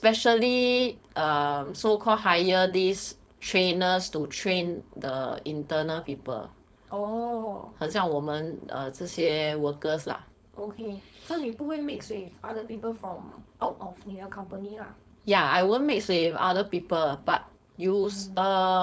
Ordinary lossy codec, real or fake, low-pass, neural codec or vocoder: none; fake; none; codec, 16 kHz, 4.8 kbps, FACodec